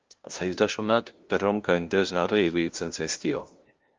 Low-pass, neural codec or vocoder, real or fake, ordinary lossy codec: 7.2 kHz; codec, 16 kHz, 0.5 kbps, FunCodec, trained on LibriTTS, 25 frames a second; fake; Opus, 24 kbps